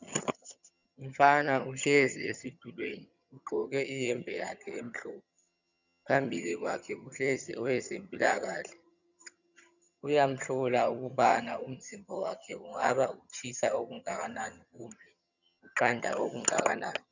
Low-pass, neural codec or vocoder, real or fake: 7.2 kHz; vocoder, 22.05 kHz, 80 mel bands, HiFi-GAN; fake